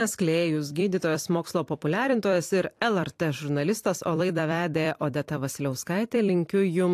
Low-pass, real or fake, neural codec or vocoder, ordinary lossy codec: 14.4 kHz; fake; vocoder, 44.1 kHz, 128 mel bands every 256 samples, BigVGAN v2; AAC, 64 kbps